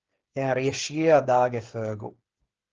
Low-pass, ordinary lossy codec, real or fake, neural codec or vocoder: 7.2 kHz; Opus, 16 kbps; fake; codec, 16 kHz, 8 kbps, FreqCodec, smaller model